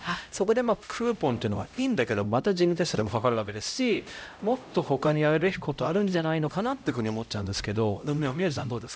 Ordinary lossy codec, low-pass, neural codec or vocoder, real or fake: none; none; codec, 16 kHz, 0.5 kbps, X-Codec, HuBERT features, trained on LibriSpeech; fake